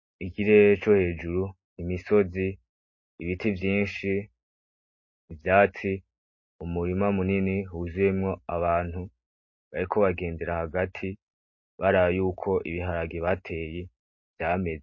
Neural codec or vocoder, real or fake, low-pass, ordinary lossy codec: none; real; 7.2 kHz; MP3, 32 kbps